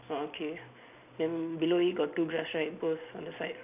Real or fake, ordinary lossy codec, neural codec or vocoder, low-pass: real; AAC, 32 kbps; none; 3.6 kHz